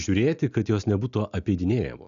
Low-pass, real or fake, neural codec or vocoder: 7.2 kHz; real; none